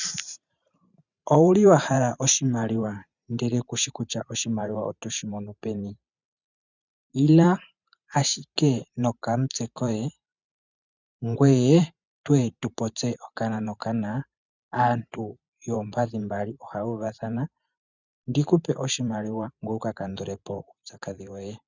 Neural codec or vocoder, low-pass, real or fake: vocoder, 44.1 kHz, 128 mel bands every 512 samples, BigVGAN v2; 7.2 kHz; fake